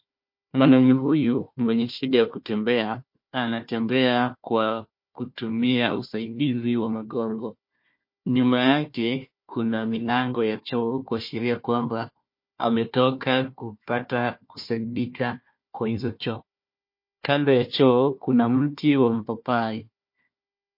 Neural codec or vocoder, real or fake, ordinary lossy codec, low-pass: codec, 16 kHz, 1 kbps, FunCodec, trained on Chinese and English, 50 frames a second; fake; MP3, 32 kbps; 5.4 kHz